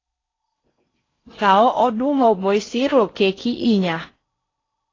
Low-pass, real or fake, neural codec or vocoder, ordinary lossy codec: 7.2 kHz; fake; codec, 16 kHz in and 24 kHz out, 0.6 kbps, FocalCodec, streaming, 4096 codes; AAC, 32 kbps